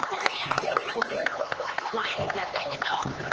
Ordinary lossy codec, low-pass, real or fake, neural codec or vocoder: Opus, 16 kbps; 7.2 kHz; fake; codec, 16 kHz, 4 kbps, X-Codec, HuBERT features, trained on LibriSpeech